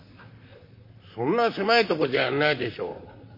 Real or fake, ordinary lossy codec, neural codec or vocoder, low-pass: fake; MP3, 32 kbps; vocoder, 44.1 kHz, 128 mel bands, Pupu-Vocoder; 5.4 kHz